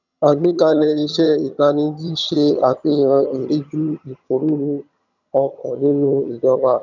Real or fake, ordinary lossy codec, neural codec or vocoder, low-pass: fake; none; vocoder, 22.05 kHz, 80 mel bands, HiFi-GAN; 7.2 kHz